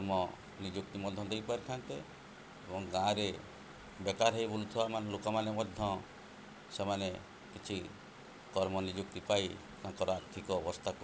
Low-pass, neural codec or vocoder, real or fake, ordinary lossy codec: none; none; real; none